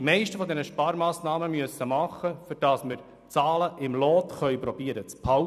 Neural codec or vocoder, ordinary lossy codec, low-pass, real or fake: vocoder, 44.1 kHz, 128 mel bands every 256 samples, BigVGAN v2; none; 14.4 kHz; fake